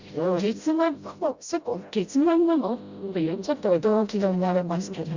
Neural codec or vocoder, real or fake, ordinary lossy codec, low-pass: codec, 16 kHz, 0.5 kbps, FreqCodec, smaller model; fake; Opus, 64 kbps; 7.2 kHz